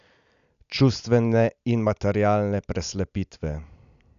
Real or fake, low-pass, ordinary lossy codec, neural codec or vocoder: real; 7.2 kHz; none; none